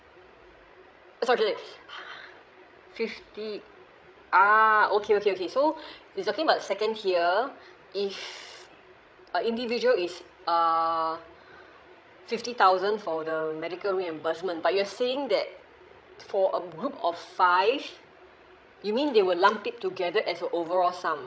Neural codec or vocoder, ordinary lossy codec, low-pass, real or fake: codec, 16 kHz, 16 kbps, FreqCodec, larger model; none; none; fake